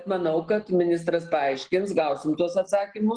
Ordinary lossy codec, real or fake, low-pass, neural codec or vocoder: Opus, 16 kbps; real; 9.9 kHz; none